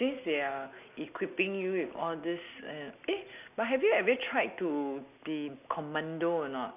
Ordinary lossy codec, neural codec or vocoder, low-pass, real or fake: none; none; 3.6 kHz; real